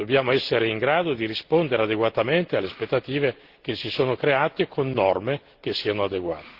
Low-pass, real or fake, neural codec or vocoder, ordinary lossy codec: 5.4 kHz; real; none; Opus, 16 kbps